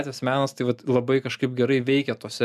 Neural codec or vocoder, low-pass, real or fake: none; 14.4 kHz; real